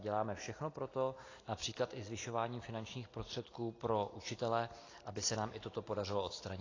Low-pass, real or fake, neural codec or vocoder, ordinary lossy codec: 7.2 kHz; real; none; AAC, 32 kbps